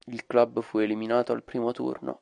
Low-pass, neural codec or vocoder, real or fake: 9.9 kHz; none; real